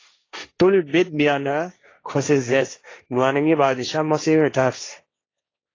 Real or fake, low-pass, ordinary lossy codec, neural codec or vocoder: fake; 7.2 kHz; AAC, 32 kbps; codec, 16 kHz, 1.1 kbps, Voila-Tokenizer